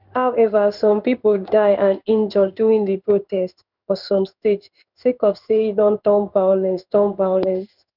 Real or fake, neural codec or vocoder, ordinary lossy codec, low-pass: fake; codec, 16 kHz in and 24 kHz out, 1 kbps, XY-Tokenizer; none; 5.4 kHz